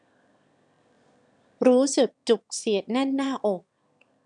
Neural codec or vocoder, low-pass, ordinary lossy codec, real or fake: autoencoder, 22.05 kHz, a latent of 192 numbers a frame, VITS, trained on one speaker; 9.9 kHz; none; fake